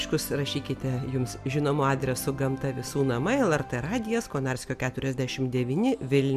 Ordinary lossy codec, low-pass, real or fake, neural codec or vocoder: MP3, 96 kbps; 14.4 kHz; real; none